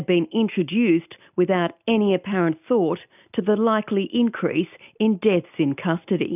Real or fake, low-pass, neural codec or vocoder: real; 3.6 kHz; none